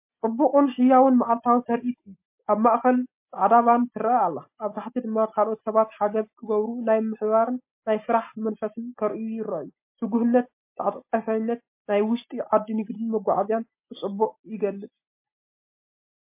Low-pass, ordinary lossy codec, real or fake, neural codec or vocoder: 3.6 kHz; MP3, 24 kbps; real; none